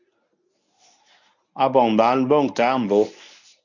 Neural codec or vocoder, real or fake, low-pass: codec, 24 kHz, 0.9 kbps, WavTokenizer, medium speech release version 1; fake; 7.2 kHz